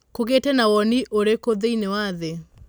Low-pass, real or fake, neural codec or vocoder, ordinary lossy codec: none; real; none; none